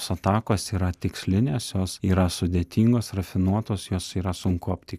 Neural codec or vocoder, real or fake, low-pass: none; real; 14.4 kHz